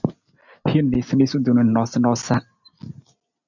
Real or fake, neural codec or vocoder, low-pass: real; none; 7.2 kHz